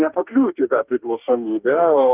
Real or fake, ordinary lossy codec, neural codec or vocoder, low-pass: fake; Opus, 24 kbps; codec, 44.1 kHz, 2.6 kbps, DAC; 3.6 kHz